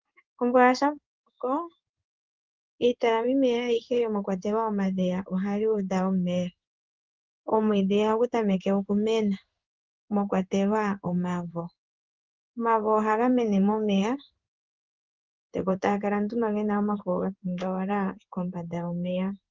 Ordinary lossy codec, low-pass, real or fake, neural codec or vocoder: Opus, 32 kbps; 7.2 kHz; fake; codec, 16 kHz in and 24 kHz out, 1 kbps, XY-Tokenizer